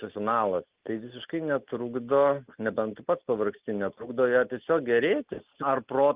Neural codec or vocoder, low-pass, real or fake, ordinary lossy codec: none; 3.6 kHz; real; Opus, 32 kbps